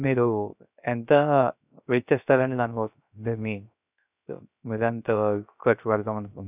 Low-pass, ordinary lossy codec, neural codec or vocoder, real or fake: 3.6 kHz; none; codec, 16 kHz, 0.3 kbps, FocalCodec; fake